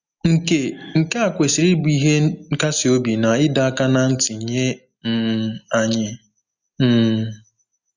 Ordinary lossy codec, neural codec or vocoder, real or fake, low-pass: Opus, 64 kbps; none; real; 7.2 kHz